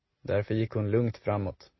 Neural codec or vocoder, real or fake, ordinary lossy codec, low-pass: none; real; MP3, 24 kbps; 7.2 kHz